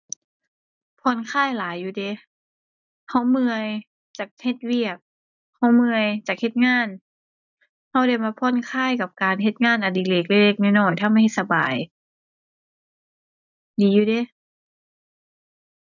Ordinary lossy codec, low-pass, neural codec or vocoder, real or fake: none; 7.2 kHz; none; real